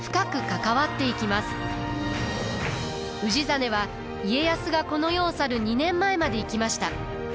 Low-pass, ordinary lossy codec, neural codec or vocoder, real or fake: none; none; none; real